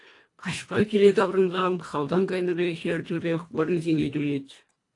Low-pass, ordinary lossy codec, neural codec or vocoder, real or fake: 10.8 kHz; AAC, 48 kbps; codec, 24 kHz, 1.5 kbps, HILCodec; fake